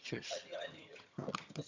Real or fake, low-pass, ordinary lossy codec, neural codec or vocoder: fake; 7.2 kHz; MP3, 64 kbps; vocoder, 22.05 kHz, 80 mel bands, HiFi-GAN